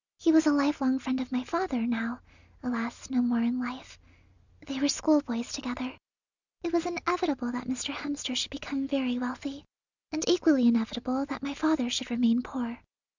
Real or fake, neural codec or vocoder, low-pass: real; none; 7.2 kHz